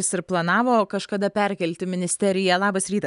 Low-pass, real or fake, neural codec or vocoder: 10.8 kHz; real; none